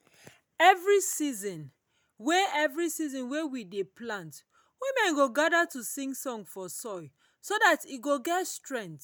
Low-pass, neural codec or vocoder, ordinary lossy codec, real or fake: none; none; none; real